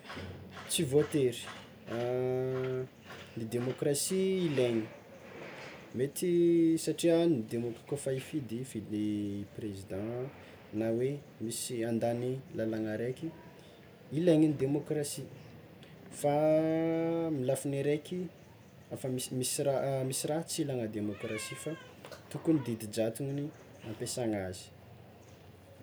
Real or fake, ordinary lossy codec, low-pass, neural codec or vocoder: real; none; none; none